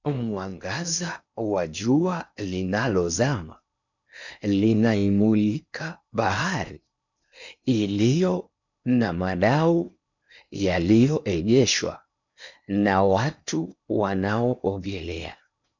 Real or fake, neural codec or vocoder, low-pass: fake; codec, 16 kHz in and 24 kHz out, 0.8 kbps, FocalCodec, streaming, 65536 codes; 7.2 kHz